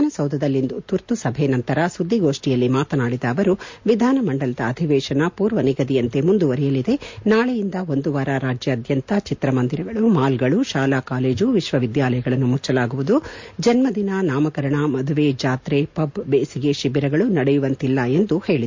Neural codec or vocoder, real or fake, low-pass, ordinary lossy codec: none; real; 7.2 kHz; MP3, 48 kbps